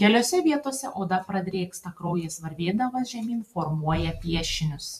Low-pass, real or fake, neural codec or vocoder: 14.4 kHz; fake; vocoder, 44.1 kHz, 128 mel bands every 512 samples, BigVGAN v2